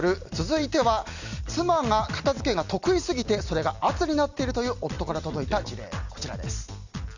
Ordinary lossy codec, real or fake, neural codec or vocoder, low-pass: Opus, 64 kbps; real; none; 7.2 kHz